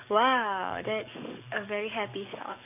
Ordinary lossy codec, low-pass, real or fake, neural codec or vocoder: none; 3.6 kHz; fake; codec, 44.1 kHz, 7.8 kbps, DAC